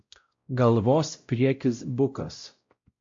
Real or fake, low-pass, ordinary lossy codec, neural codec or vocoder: fake; 7.2 kHz; MP3, 48 kbps; codec, 16 kHz, 0.5 kbps, X-Codec, WavLM features, trained on Multilingual LibriSpeech